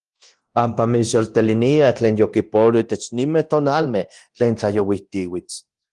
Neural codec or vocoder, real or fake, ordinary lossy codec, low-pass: codec, 24 kHz, 0.9 kbps, DualCodec; fake; Opus, 24 kbps; 10.8 kHz